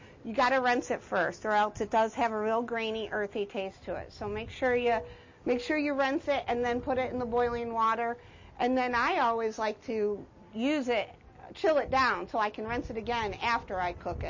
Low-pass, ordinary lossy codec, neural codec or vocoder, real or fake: 7.2 kHz; MP3, 32 kbps; none; real